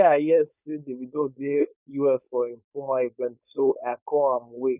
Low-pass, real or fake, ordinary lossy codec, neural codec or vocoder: 3.6 kHz; fake; none; codec, 16 kHz, 4.8 kbps, FACodec